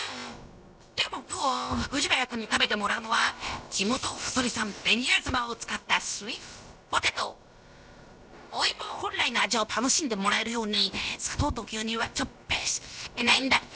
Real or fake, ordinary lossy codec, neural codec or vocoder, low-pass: fake; none; codec, 16 kHz, about 1 kbps, DyCAST, with the encoder's durations; none